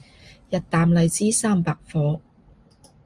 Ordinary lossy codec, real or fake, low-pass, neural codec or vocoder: Opus, 32 kbps; real; 10.8 kHz; none